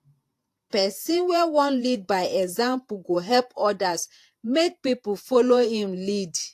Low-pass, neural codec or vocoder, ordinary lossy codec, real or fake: 14.4 kHz; vocoder, 48 kHz, 128 mel bands, Vocos; AAC, 64 kbps; fake